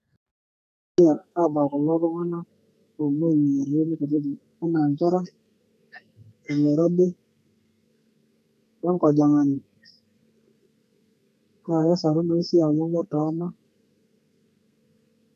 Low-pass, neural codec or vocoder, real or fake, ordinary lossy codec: 14.4 kHz; codec, 32 kHz, 1.9 kbps, SNAC; fake; none